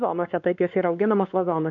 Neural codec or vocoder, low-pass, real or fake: codec, 16 kHz, 2 kbps, X-Codec, WavLM features, trained on Multilingual LibriSpeech; 7.2 kHz; fake